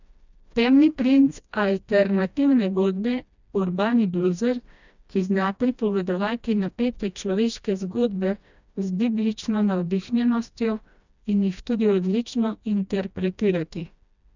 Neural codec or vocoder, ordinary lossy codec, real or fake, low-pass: codec, 16 kHz, 1 kbps, FreqCodec, smaller model; none; fake; 7.2 kHz